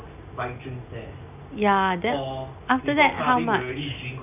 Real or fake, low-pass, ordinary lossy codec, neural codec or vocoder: real; 3.6 kHz; none; none